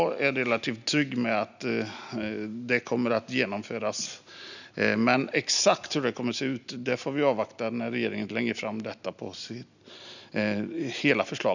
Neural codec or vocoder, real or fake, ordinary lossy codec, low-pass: none; real; none; 7.2 kHz